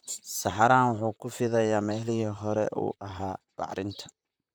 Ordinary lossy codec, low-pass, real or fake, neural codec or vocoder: none; none; fake; vocoder, 44.1 kHz, 128 mel bands, Pupu-Vocoder